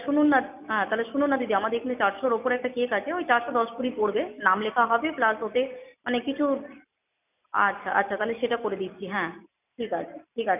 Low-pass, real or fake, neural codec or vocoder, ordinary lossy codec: 3.6 kHz; real; none; none